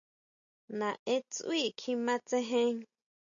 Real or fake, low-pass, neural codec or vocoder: real; 7.2 kHz; none